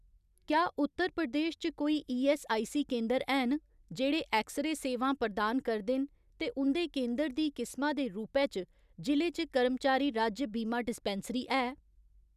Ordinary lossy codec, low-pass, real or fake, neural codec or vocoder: none; 14.4 kHz; real; none